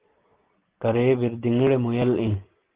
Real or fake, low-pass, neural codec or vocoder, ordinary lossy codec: real; 3.6 kHz; none; Opus, 16 kbps